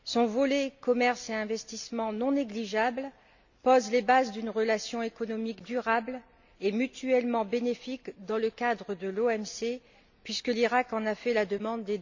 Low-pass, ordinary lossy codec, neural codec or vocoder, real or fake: 7.2 kHz; none; none; real